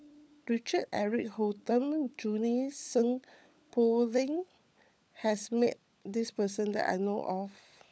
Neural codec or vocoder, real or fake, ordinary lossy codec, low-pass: codec, 16 kHz, 16 kbps, FunCodec, trained on LibriTTS, 50 frames a second; fake; none; none